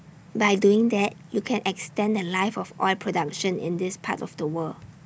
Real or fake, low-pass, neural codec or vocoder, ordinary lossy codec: real; none; none; none